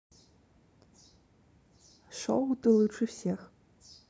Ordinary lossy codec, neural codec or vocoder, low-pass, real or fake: none; none; none; real